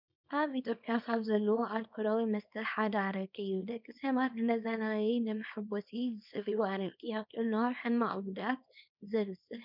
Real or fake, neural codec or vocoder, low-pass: fake; codec, 24 kHz, 0.9 kbps, WavTokenizer, small release; 5.4 kHz